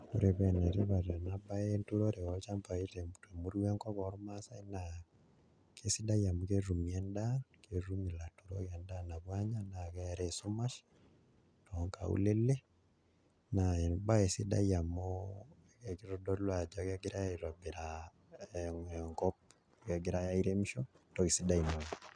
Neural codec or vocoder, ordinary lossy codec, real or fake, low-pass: none; none; real; 9.9 kHz